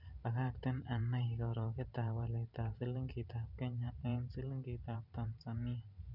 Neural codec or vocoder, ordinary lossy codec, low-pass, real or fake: vocoder, 44.1 kHz, 128 mel bands every 512 samples, BigVGAN v2; none; 5.4 kHz; fake